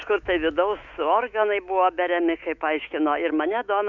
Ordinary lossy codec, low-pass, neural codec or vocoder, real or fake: Opus, 64 kbps; 7.2 kHz; autoencoder, 48 kHz, 128 numbers a frame, DAC-VAE, trained on Japanese speech; fake